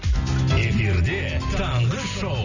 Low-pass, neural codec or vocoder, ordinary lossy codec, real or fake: 7.2 kHz; none; none; real